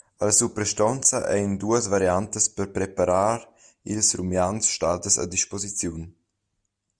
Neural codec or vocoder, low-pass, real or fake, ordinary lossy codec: none; 9.9 kHz; real; Opus, 64 kbps